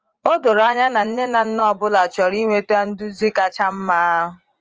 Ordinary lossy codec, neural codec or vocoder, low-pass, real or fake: Opus, 24 kbps; vocoder, 44.1 kHz, 80 mel bands, Vocos; 7.2 kHz; fake